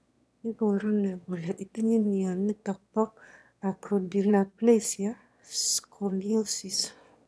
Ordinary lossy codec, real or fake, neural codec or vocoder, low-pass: none; fake; autoencoder, 22.05 kHz, a latent of 192 numbers a frame, VITS, trained on one speaker; none